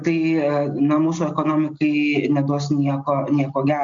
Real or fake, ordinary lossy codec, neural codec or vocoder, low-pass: real; MP3, 48 kbps; none; 7.2 kHz